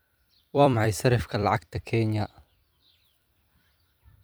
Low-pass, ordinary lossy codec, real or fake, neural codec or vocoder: none; none; fake; vocoder, 44.1 kHz, 128 mel bands every 256 samples, BigVGAN v2